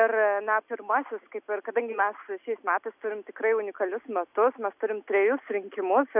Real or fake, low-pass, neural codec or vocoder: real; 3.6 kHz; none